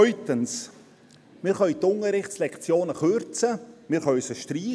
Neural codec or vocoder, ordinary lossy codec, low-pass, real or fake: none; none; none; real